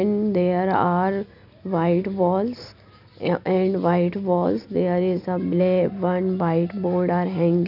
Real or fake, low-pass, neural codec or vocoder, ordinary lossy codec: real; 5.4 kHz; none; none